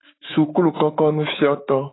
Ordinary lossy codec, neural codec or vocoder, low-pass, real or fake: AAC, 16 kbps; autoencoder, 48 kHz, 128 numbers a frame, DAC-VAE, trained on Japanese speech; 7.2 kHz; fake